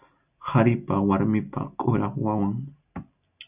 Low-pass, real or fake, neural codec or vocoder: 3.6 kHz; real; none